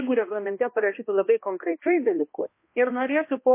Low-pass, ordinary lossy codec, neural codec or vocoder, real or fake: 3.6 kHz; MP3, 24 kbps; codec, 16 kHz, 1 kbps, X-Codec, HuBERT features, trained on balanced general audio; fake